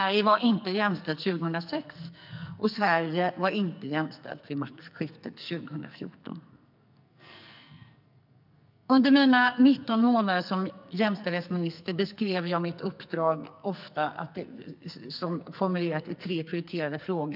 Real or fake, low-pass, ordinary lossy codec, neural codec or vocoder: fake; 5.4 kHz; none; codec, 44.1 kHz, 2.6 kbps, SNAC